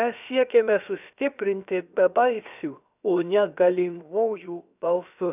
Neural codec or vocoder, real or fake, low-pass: codec, 16 kHz, about 1 kbps, DyCAST, with the encoder's durations; fake; 3.6 kHz